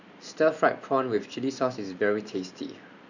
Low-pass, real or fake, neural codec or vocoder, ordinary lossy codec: 7.2 kHz; fake; vocoder, 44.1 kHz, 128 mel bands every 512 samples, BigVGAN v2; none